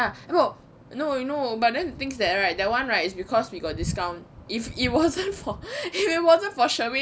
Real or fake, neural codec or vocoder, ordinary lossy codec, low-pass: real; none; none; none